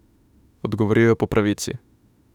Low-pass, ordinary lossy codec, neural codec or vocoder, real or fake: 19.8 kHz; none; autoencoder, 48 kHz, 32 numbers a frame, DAC-VAE, trained on Japanese speech; fake